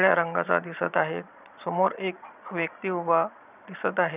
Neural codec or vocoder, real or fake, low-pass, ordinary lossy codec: vocoder, 22.05 kHz, 80 mel bands, Vocos; fake; 3.6 kHz; none